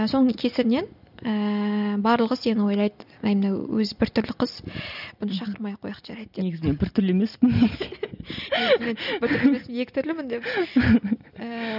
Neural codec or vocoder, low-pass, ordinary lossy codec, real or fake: none; 5.4 kHz; none; real